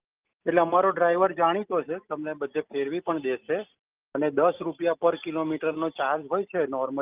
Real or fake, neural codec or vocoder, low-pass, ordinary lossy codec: real; none; 3.6 kHz; Opus, 24 kbps